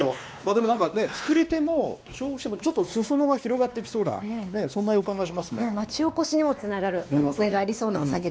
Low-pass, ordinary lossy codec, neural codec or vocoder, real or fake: none; none; codec, 16 kHz, 2 kbps, X-Codec, WavLM features, trained on Multilingual LibriSpeech; fake